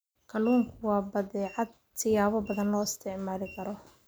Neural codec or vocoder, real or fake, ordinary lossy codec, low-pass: none; real; none; none